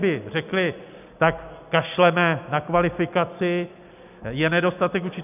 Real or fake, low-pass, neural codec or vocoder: real; 3.6 kHz; none